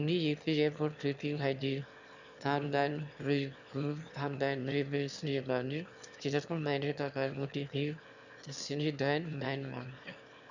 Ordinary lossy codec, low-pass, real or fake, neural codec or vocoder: none; 7.2 kHz; fake; autoencoder, 22.05 kHz, a latent of 192 numbers a frame, VITS, trained on one speaker